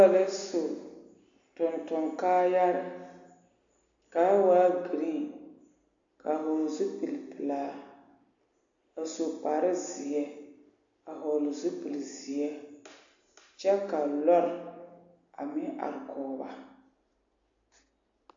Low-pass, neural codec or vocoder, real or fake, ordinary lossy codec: 7.2 kHz; none; real; AAC, 64 kbps